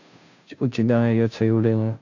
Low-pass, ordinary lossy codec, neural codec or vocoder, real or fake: 7.2 kHz; AAC, 48 kbps; codec, 16 kHz, 0.5 kbps, FunCodec, trained on Chinese and English, 25 frames a second; fake